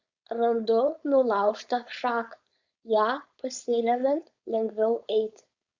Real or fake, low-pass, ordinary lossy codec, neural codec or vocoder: fake; 7.2 kHz; Opus, 64 kbps; codec, 16 kHz, 4.8 kbps, FACodec